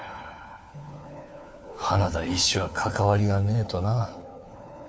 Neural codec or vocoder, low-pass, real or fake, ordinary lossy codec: codec, 16 kHz, 4 kbps, FunCodec, trained on LibriTTS, 50 frames a second; none; fake; none